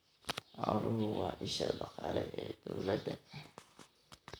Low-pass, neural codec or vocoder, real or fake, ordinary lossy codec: none; vocoder, 44.1 kHz, 128 mel bands, Pupu-Vocoder; fake; none